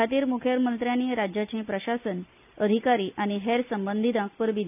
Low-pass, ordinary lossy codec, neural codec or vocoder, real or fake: 3.6 kHz; none; none; real